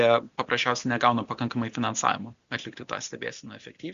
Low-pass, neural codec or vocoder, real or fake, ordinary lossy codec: 7.2 kHz; none; real; Opus, 64 kbps